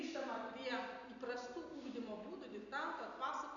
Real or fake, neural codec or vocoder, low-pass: real; none; 7.2 kHz